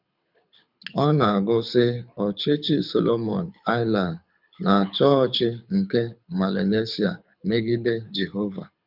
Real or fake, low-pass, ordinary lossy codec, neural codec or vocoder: fake; 5.4 kHz; none; codec, 24 kHz, 6 kbps, HILCodec